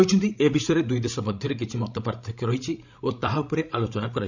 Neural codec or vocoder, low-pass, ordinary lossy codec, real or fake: codec, 16 kHz, 16 kbps, FreqCodec, larger model; 7.2 kHz; none; fake